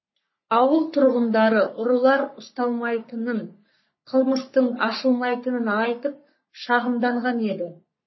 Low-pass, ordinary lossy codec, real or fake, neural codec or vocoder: 7.2 kHz; MP3, 24 kbps; fake; codec, 44.1 kHz, 3.4 kbps, Pupu-Codec